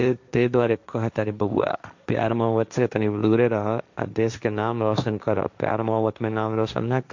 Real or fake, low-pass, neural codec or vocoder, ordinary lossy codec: fake; 7.2 kHz; codec, 16 kHz, 1.1 kbps, Voila-Tokenizer; MP3, 64 kbps